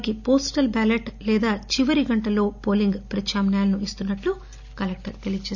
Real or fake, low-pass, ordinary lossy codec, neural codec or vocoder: real; 7.2 kHz; none; none